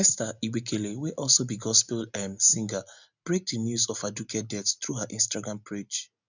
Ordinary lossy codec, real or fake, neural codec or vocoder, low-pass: none; real; none; 7.2 kHz